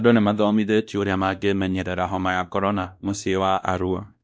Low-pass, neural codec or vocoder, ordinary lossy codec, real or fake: none; codec, 16 kHz, 1 kbps, X-Codec, WavLM features, trained on Multilingual LibriSpeech; none; fake